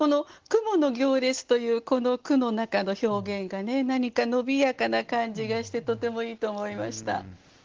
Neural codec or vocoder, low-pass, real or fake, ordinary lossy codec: none; 7.2 kHz; real; Opus, 16 kbps